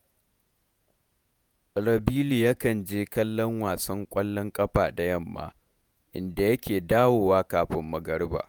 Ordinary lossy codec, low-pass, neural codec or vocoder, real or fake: none; none; vocoder, 48 kHz, 128 mel bands, Vocos; fake